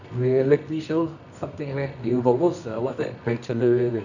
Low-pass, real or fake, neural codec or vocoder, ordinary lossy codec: 7.2 kHz; fake; codec, 24 kHz, 0.9 kbps, WavTokenizer, medium music audio release; none